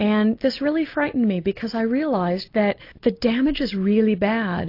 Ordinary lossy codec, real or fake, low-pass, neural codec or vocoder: AAC, 32 kbps; real; 5.4 kHz; none